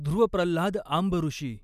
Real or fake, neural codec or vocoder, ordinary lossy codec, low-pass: real; none; none; 14.4 kHz